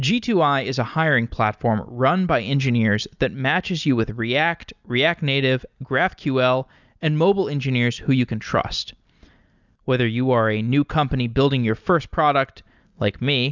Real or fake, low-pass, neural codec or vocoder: real; 7.2 kHz; none